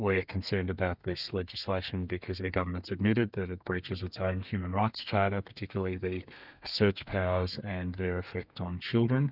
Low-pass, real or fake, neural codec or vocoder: 5.4 kHz; fake; codec, 32 kHz, 1.9 kbps, SNAC